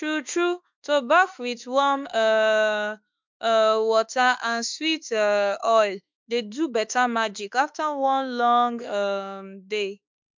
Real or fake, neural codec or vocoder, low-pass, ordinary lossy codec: fake; autoencoder, 48 kHz, 32 numbers a frame, DAC-VAE, trained on Japanese speech; 7.2 kHz; MP3, 64 kbps